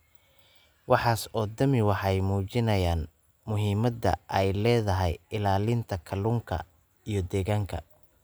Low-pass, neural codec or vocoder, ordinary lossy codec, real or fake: none; none; none; real